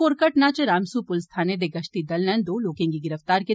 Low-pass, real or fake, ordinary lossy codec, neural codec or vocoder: none; real; none; none